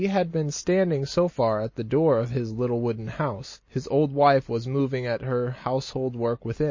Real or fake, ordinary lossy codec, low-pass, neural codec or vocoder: real; MP3, 32 kbps; 7.2 kHz; none